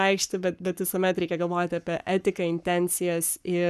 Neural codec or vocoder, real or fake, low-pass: codec, 44.1 kHz, 7.8 kbps, Pupu-Codec; fake; 14.4 kHz